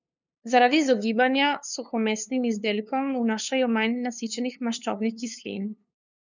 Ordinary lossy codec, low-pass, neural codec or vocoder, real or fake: none; 7.2 kHz; codec, 16 kHz, 2 kbps, FunCodec, trained on LibriTTS, 25 frames a second; fake